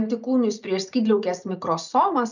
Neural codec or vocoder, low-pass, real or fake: none; 7.2 kHz; real